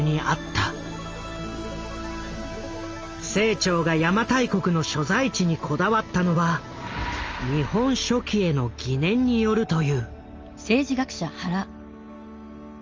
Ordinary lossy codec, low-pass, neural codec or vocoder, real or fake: Opus, 24 kbps; 7.2 kHz; none; real